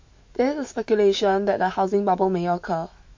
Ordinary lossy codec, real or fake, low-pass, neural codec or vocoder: MP3, 48 kbps; fake; 7.2 kHz; autoencoder, 48 kHz, 128 numbers a frame, DAC-VAE, trained on Japanese speech